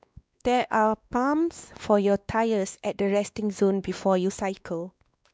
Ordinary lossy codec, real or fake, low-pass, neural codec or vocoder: none; fake; none; codec, 16 kHz, 2 kbps, X-Codec, WavLM features, trained on Multilingual LibriSpeech